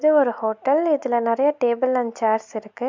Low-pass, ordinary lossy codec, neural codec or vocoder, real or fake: 7.2 kHz; MP3, 64 kbps; none; real